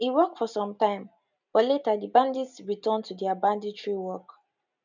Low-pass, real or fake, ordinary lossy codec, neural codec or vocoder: 7.2 kHz; real; none; none